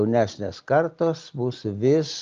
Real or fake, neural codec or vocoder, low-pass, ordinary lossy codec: real; none; 7.2 kHz; Opus, 24 kbps